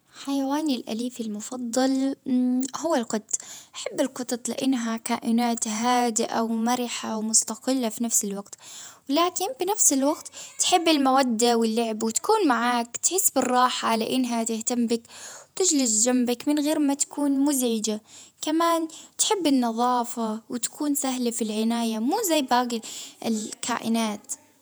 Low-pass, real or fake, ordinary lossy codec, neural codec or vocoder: none; fake; none; vocoder, 48 kHz, 128 mel bands, Vocos